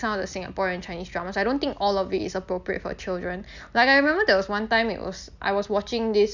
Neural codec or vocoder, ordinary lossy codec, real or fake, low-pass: none; none; real; 7.2 kHz